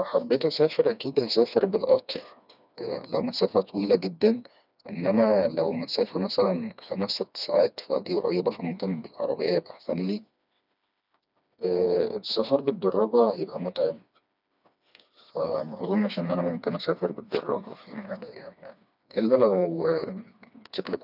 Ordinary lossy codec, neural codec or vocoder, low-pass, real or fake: none; codec, 16 kHz, 2 kbps, FreqCodec, smaller model; 5.4 kHz; fake